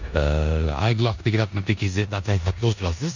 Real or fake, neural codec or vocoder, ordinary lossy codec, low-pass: fake; codec, 16 kHz in and 24 kHz out, 0.9 kbps, LongCat-Audio-Codec, four codebook decoder; none; 7.2 kHz